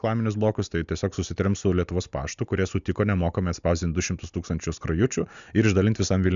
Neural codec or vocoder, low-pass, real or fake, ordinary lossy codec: none; 7.2 kHz; real; MP3, 96 kbps